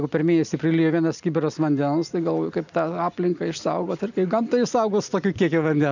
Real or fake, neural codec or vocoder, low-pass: real; none; 7.2 kHz